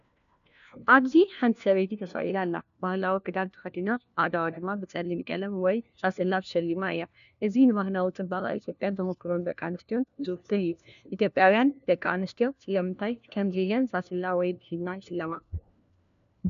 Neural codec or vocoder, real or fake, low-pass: codec, 16 kHz, 1 kbps, FunCodec, trained on LibriTTS, 50 frames a second; fake; 7.2 kHz